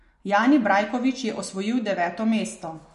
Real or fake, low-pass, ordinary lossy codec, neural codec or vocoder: real; 14.4 kHz; MP3, 48 kbps; none